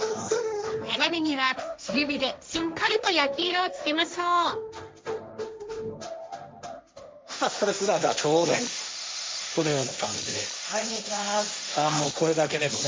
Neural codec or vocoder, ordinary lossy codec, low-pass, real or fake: codec, 16 kHz, 1.1 kbps, Voila-Tokenizer; none; none; fake